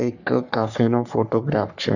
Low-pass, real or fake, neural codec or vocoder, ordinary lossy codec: 7.2 kHz; fake; codec, 44.1 kHz, 3.4 kbps, Pupu-Codec; none